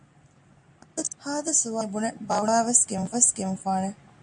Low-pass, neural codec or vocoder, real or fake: 9.9 kHz; none; real